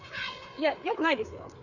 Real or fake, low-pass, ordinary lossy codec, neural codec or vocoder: fake; 7.2 kHz; none; codec, 16 kHz, 4 kbps, FreqCodec, larger model